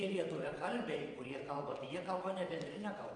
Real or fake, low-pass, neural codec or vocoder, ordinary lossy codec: fake; 9.9 kHz; vocoder, 22.05 kHz, 80 mel bands, WaveNeXt; AAC, 48 kbps